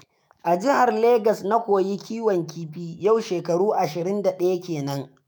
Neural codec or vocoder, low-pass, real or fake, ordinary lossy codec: autoencoder, 48 kHz, 128 numbers a frame, DAC-VAE, trained on Japanese speech; none; fake; none